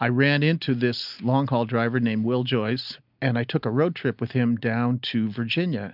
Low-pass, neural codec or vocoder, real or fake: 5.4 kHz; none; real